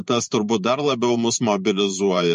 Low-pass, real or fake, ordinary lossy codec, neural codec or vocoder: 14.4 kHz; fake; MP3, 48 kbps; vocoder, 44.1 kHz, 128 mel bands every 512 samples, BigVGAN v2